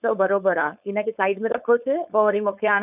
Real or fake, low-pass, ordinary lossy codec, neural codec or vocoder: fake; 3.6 kHz; none; codec, 16 kHz, 4.8 kbps, FACodec